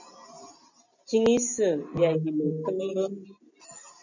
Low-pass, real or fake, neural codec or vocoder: 7.2 kHz; real; none